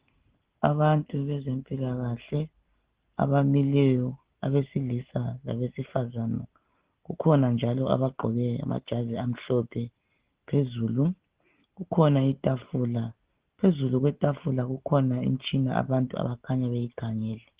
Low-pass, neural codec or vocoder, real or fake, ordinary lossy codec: 3.6 kHz; none; real; Opus, 16 kbps